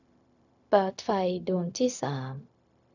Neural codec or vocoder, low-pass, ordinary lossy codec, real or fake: codec, 16 kHz, 0.4 kbps, LongCat-Audio-Codec; 7.2 kHz; none; fake